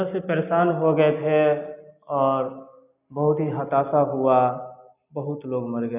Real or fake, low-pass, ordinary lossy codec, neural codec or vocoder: real; 3.6 kHz; none; none